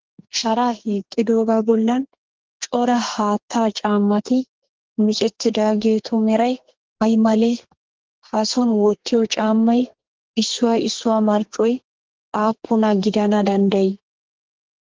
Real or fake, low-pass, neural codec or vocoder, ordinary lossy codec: fake; 7.2 kHz; codec, 32 kHz, 1.9 kbps, SNAC; Opus, 16 kbps